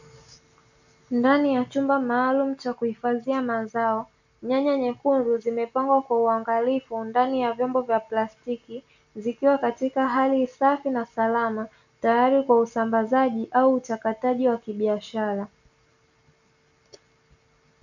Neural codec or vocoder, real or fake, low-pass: none; real; 7.2 kHz